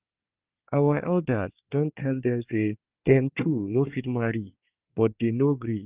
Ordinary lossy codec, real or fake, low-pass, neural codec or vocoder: Opus, 24 kbps; fake; 3.6 kHz; codec, 24 kHz, 1 kbps, SNAC